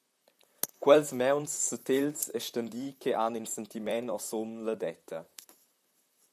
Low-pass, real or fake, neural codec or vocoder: 14.4 kHz; fake; vocoder, 44.1 kHz, 128 mel bands, Pupu-Vocoder